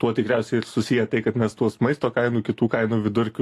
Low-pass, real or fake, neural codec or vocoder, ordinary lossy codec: 14.4 kHz; real; none; AAC, 64 kbps